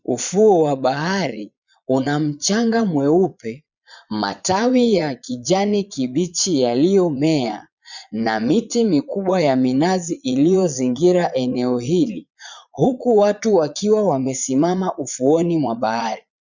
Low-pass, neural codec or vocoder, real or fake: 7.2 kHz; vocoder, 22.05 kHz, 80 mel bands, Vocos; fake